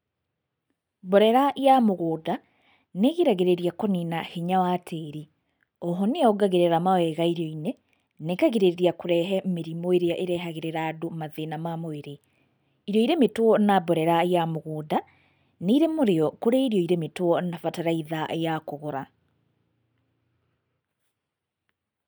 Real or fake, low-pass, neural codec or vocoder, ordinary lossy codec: real; none; none; none